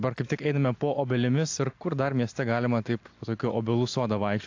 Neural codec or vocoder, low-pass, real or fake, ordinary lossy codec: none; 7.2 kHz; real; AAC, 48 kbps